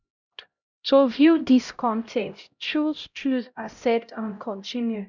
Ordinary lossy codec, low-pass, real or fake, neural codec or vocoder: none; 7.2 kHz; fake; codec, 16 kHz, 0.5 kbps, X-Codec, HuBERT features, trained on LibriSpeech